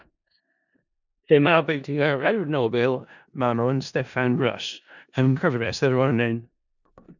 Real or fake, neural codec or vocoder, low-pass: fake; codec, 16 kHz in and 24 kHz out, 0.4 kbps, LongCat-Audio-Codec, four codebook decoder; 7.2 kHz